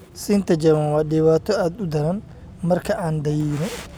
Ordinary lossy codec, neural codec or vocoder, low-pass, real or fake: none; none; none; real